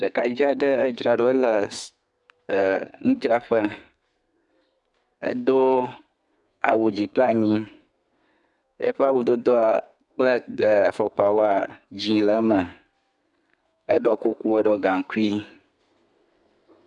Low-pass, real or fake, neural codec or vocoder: 10.8 kHz; fake; codec, 32 kHz, 1.9 kbps, SNAC